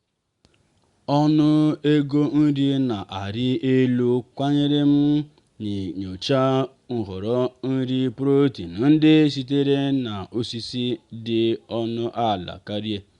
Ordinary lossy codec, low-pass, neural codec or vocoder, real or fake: Opus, 64 kbps; 10.8 kHz; none; real